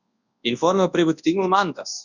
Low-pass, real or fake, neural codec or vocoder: 7.2 kHz; fake; codec, 24 kHz, 0.9 kbps, WavTokenizer, large speech release